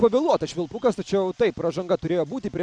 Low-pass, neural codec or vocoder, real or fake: 9.9 kHz; none; real